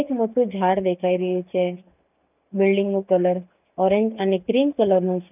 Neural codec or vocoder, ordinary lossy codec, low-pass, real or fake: none; none; 3.6 kHz; real